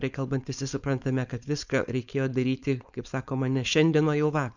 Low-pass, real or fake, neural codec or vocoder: 7.2 kHz; fake; codec, 16 kHz, 4.8 kbps, FACodec